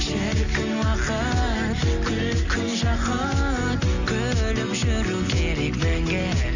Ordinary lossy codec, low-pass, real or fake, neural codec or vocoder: none; 7.2 kHz; real; none